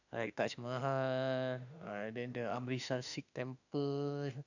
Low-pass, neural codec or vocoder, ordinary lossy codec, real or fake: 7.2 kHz; autoencoder, 48 kHz, 32 numbers a frame, DAC-VAE, trained on Japanese speech; none; fake